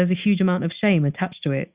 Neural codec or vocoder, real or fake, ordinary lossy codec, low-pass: none; real; Opus, 64 kbps; 3.6 kHz